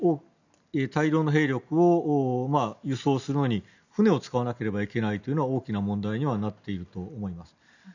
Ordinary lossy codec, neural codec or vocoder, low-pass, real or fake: none; none; 7.2 kHz; real